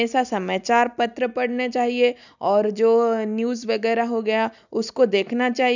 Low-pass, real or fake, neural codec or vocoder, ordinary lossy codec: 7.2 kHz; real; none; none